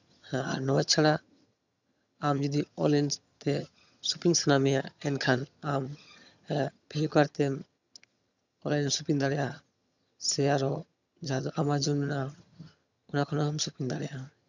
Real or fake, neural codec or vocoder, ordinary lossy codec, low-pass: fake; vocoder, 22.05 kHz, 80 mel bands, HiFi-GAN; none; 7.2 kHz